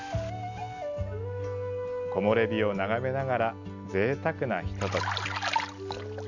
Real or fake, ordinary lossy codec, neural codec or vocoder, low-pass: real; none; none; 7.2 kHz